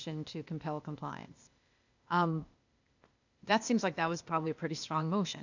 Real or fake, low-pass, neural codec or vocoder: fake; 7.2 kHz; codec, 16 kHz, 0.8 kbps, ZipCodec